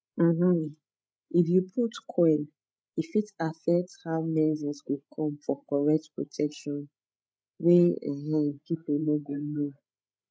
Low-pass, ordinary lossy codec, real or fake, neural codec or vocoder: none; none; fake; codec, 16 kHz, 16 kbps, FreqCodec, larger model